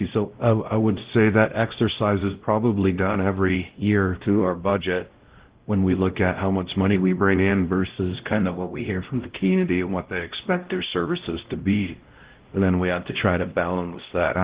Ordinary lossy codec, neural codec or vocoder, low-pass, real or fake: Opus, 16 kbps; codec, 16 kHz, 0.5 kbps, X-Codec, WavLM features, trained on Multilingual LibriSpeech; 3.6 kHz; fake